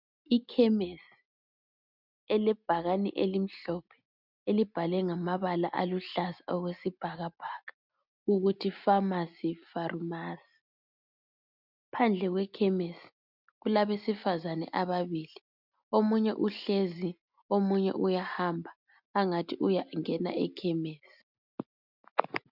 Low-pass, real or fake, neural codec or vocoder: 5.4 kHz; real; none